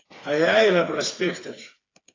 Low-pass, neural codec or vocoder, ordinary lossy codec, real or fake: 7.2 kHz; codec, 16 kHz, 4 kbps, FreqCodec, smaller model; AAC, 32 kbps; fake